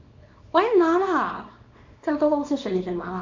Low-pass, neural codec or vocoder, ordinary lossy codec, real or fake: 7.2 kHz; codec, 24 kHz, 0.9 kbps, WavTokenizer, small release; MP3, 48 kbps; fake